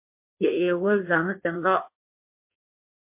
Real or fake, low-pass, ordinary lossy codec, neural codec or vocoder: fake; 3.6 kHz; MP3, 24 kbps; codec, 16 kHz in and 24 kHz out, 1.1 kbps, FireRedTTS-2 codec